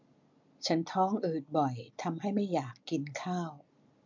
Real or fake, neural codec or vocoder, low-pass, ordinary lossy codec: real; none; 7.2 kHz; MP3, 64 kbps